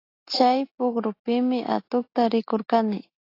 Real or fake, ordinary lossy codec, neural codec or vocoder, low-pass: real; AAC, 32 kbps; none; 5.4 kHz